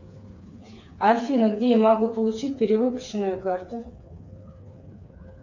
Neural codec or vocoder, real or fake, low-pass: codec, 16 kHz, 4 kbps, FreqCodec, smaller model; fake; 7.2 kHz